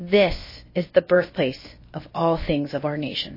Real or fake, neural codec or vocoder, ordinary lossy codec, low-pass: fake; codec, 16 kHz, about 1 kbps, DyCAST, with the encoder's durations; MP3, 24 kbps; 5.4 kHz